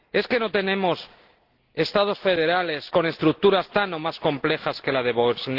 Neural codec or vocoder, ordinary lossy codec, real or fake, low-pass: none; Opus, 16 kbps; real; 5.4 kHz